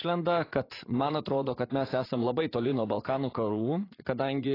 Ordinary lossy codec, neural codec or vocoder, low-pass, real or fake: AAC, 24 kbps; vocoder, 44.1 kHz, 80 mel bands, Vocos; 5.4 kHz; fake